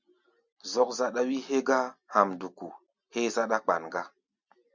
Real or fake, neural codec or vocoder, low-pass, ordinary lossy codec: real; none; 7.2 kHz; MP3, 64 kbps